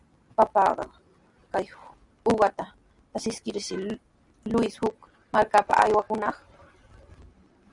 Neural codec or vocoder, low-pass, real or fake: none; 10.8 kHz; real